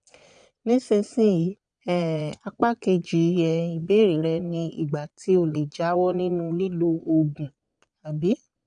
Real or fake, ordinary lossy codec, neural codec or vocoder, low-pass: fake; none; vocoder, 22.05 kHz, 80 mel bands, Vocos; 9.9 kHz